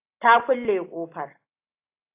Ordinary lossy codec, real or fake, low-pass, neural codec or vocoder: AAC, 24 kbps; real; 3.6 kHz; none